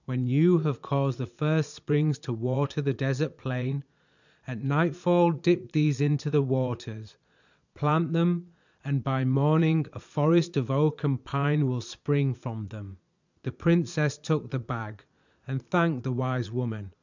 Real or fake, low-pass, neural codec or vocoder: fake; 7.2 kHz; vocoder, 22.05 kHz, 80 mel bands, Vocos